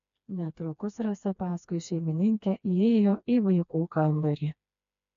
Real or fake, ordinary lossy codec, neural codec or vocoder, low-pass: fake; AAC, 96 kbps; codec, 16 kHz, 2 kbps, FreqCodec, smaller model; 7.2 kHz